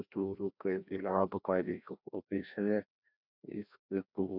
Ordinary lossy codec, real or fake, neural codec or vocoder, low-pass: none; fake; codec, 16 kHz, 1 kbps, FreqCodec, larger model; 5.4 kHz